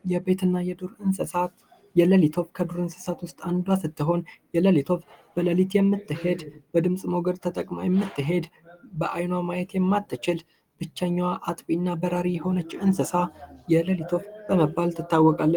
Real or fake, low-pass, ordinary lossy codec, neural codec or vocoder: real; 19.8 kHz; Opus, 24 kbps; none